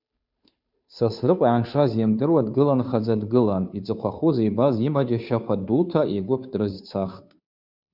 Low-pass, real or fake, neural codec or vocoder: 5.4 kHz; fake; codec, 16 kHz, 2 kbps, FunCodec, trained on Chinese and English, 25 frames a second